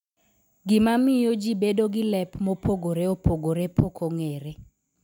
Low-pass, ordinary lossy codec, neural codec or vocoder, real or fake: 19.8 kHz; none; none; real